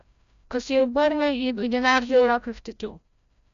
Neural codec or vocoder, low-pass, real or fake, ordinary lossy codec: codec, 16 kHz, 0.5 kbps, FreqCodec, larger model; 7.2 kHz; fake; AAC, 96 kbps